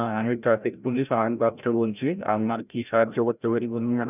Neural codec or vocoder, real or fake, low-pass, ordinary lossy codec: codec, 16 kHz, 0.5 kbps, FreqCodec, larger model; fake; 3.6 kHz; none